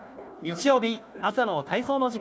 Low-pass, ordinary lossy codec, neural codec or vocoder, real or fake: none; none; codec, 16 kHz, 1 kbps, FunCodec, trained on Chinese and English, 50 frames a second; fake